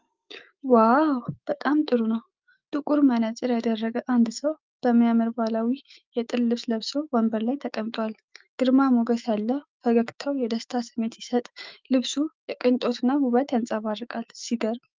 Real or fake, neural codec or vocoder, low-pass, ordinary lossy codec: fake; autoencoder, 48 kHz, 128 numbers a frame, DAC-VAE, trained on Japanese speech; 7.2 kHz; Opus, 24 kbps